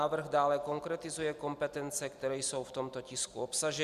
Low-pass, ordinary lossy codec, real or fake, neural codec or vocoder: 14.4 kHz; Opus, 64 kbps; real; none